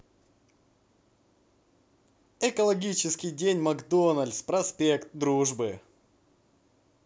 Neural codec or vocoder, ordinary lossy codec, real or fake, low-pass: none; none; real; none